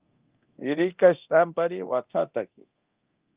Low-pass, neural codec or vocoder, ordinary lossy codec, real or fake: 3.6 kHz; codec, 24 kHz, 0.9 kbps, DualCodec; Opus, 32 kbps; fake